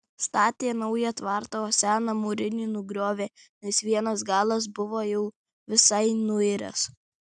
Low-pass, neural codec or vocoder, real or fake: 9.9 kHz; none; real